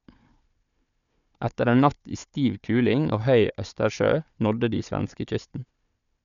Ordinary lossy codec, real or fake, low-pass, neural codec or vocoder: none; fake; 7.2 kHz; codec, 16 kHz, 4 kbps, FunCodec, trained on Chinese and English, 50 frames a second